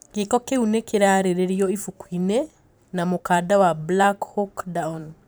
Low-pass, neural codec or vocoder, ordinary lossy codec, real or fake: none; vocoder, 44.1 kHz, 128 mel bands every 512 samples, BigVGAN v2; none; fake